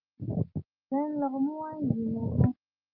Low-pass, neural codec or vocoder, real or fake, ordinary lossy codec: 5.4 kHz; none; real; Opus, 32 kbps